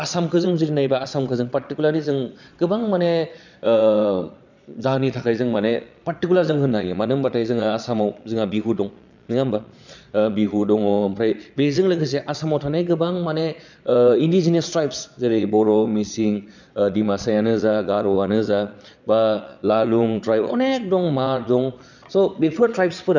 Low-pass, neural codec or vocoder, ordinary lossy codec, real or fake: 7.2 kHz; vocoder, 22.05 kHz, 80 mel bands, Vocos; none; fake